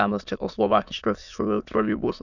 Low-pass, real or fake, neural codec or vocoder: 7.2 kHz; fake; autoencoder, 22.05 kHz, a latent of 192 numbers a frame, VITS, trained on many speakers